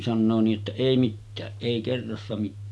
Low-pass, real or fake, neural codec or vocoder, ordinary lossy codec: none; real; none; none